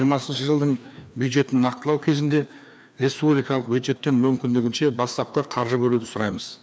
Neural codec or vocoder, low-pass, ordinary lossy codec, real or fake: codec, 16 kHz, 2 kbps, FreqCodec, larger model; none; none; fake